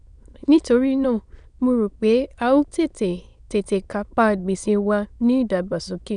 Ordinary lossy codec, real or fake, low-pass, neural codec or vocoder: none; fake; 9.9 kHz; autoencoder, 22.05 kHz, a latent of 192 numbers a frame, VITS, trained on many speakers